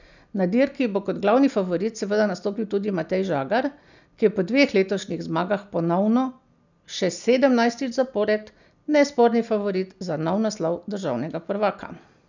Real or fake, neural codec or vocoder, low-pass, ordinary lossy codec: real; none; 7.2 kHz; none